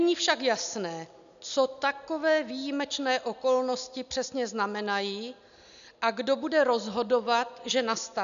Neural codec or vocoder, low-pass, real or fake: none; 7.2 kHz; real